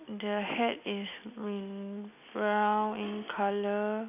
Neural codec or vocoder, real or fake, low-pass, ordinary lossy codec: none; real; 3.6 kHz; none